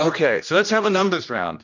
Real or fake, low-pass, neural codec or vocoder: fake; 7.2 kHz; codec, 16 kHz, 1 kbps, X-Codec, HuBERT features, trained on general audio